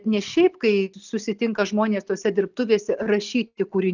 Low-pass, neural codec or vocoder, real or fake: 7.2 kHz; none; real